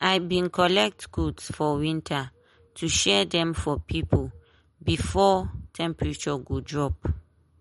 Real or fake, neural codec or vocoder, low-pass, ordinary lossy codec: real; none; 19.8 kHz; MP3, 48 kbps